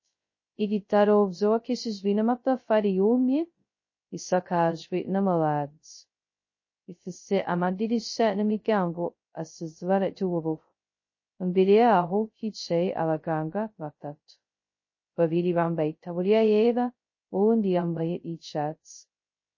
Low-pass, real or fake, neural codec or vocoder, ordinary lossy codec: 7.2 kHz; fake; codec, 16 kHz, 0.2 kbps, FocalCodec; MP3, 32 kbps